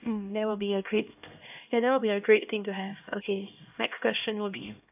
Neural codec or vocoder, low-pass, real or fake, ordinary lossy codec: codec, 16 kHz, 1 kbps, X-Codec, HuBERT features, trained on LibriSpeech; 3.6 kHz; fake; AAC, 32 kbps